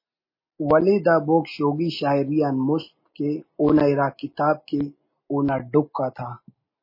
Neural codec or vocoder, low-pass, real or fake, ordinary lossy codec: none; 5.4 kHz; real; MP3, 24 kbps